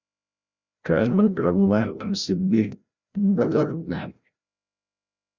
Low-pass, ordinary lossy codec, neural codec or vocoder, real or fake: 7.2 kHz; Opus, 64 kbps; codec, 16 kHz, 0.5 kbps, FreqCodec, larger model; fake